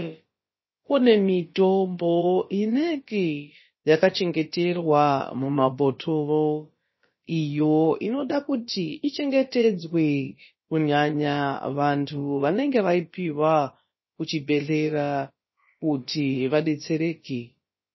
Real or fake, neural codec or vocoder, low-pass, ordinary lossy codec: fake; codec, 16 kHz, about 1 kbps, DyCAST, with the encoder's durations; 7.2 kHz; MP3, 24 kbps